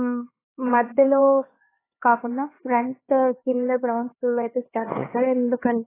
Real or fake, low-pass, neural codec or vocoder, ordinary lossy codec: fake; 3.6 kHz; codec, 16 kHz, 4 kbps, X-Codec, HuBERT features, trained on LibriSpeech; AAC, 16 kbps